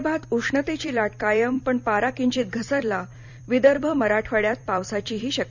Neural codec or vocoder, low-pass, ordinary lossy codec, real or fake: vocoder, 44.1 kHz, 128 mel bands every 256 samples, BigVGAN v2; 7.2 kHz; none; fake